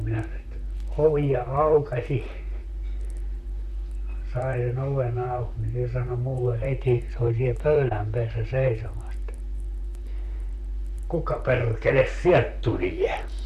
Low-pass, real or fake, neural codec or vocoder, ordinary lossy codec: 14.4 kHz; fake; vocoder, 44.1 kHz, 128 mel bands, Pupu-Vocoder; none